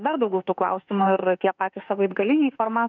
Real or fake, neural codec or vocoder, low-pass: fake; autoencoder, 48 kHz, 32 numbers a frame, DAC-VAE, trained on Japanese speech; 7.2 kHz